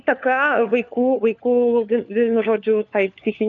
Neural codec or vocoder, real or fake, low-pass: codec, 16 kHz, 4 kbps, FunCodec, trained on LibriTTS, 50 frames a second; fake; 7.2 kHz